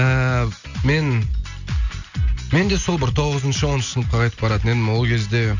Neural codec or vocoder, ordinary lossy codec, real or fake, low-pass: none; none; real; 7.2 kHz